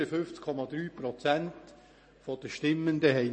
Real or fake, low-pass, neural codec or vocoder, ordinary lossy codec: real; 9.9 kHz; none; MP3, 32 kbps